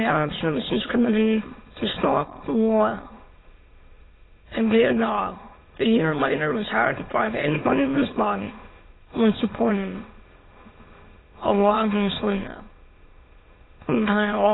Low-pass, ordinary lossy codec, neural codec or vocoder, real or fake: 7.2 kHz; AAC, 16 kbps; autoencoder, 22.05 kHz, a latent of 192 numbers a frame, VITS, trained on many speakers; fake